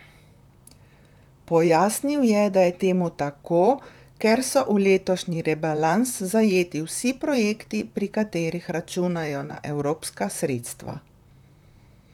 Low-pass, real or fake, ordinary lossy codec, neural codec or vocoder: 19.8 kHz; fake; none; vocoder, 44.1 kHz, 128 mel bands, Pupu-Vocoder